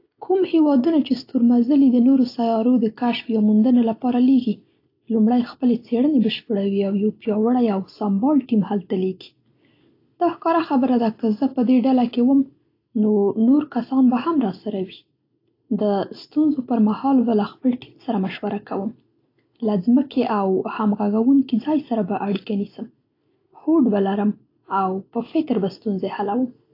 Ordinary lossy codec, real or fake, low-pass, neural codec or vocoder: AAC, 32 kbps; real; 5.4 kHz; none